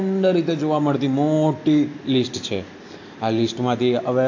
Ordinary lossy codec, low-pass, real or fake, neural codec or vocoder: AAC, 48 kbps; 7.2 kHz; real; none